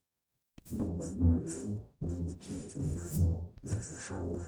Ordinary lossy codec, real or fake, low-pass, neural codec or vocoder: none; fake; none; codec, 44.1 kHz, 0.9 kbps, DAC